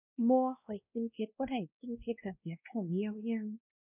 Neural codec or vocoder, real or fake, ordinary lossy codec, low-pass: codec, 16 kHz, 1 kbps, X-Codec, WavLM features, trained on Multilingual LibriSpeech; fake; none; 3.6 kHz